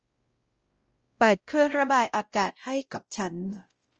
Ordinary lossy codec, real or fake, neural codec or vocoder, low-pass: Opus, 24 kbps; fake; codec, 16 kHz, 0.5 kbps, X-Codec, WavLM features, trained on Multilingual LibriSpeech; 7.2 kHz